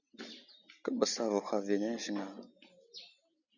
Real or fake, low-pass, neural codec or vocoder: real; 7.2 kHz; none